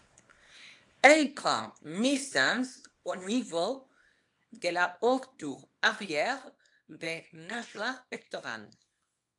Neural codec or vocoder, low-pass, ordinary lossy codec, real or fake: codec, 24 kHz, 0.9 kbps, WavTokenizer, small release; 10.8 kHz; AAC, 64 kbps; fake